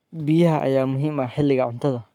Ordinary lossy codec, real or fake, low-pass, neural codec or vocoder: none; fake; 19.8 kHz; codec, 44.1 kHz, 7.8 kbps, Pupu-Codec